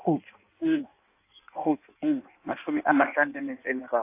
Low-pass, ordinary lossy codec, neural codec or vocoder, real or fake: 3.6 kHz; AAC, 24 kbps; codec, 16 kHz in and 24 kHz out, 1.1 kbps, FireRedTTS-2 codec; fake